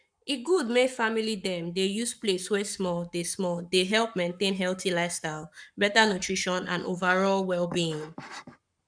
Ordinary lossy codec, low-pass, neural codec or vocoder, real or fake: none; 9.9 kHz; codec, 44.1 kHz, 7.8 kbps, Pupu-Codec; fake